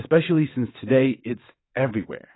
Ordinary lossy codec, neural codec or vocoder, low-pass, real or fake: AAC, 16 kbps; vocoder, 22.05 kHz, 80 mel bands, WaveNeXt; 7.2 kHz; fake